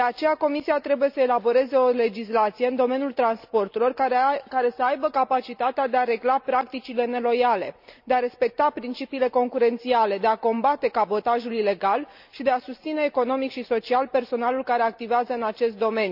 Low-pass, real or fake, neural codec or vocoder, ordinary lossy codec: 5.4 kHz; real; none; none